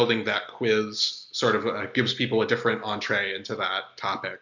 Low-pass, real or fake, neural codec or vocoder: 7.2 kHz; real; none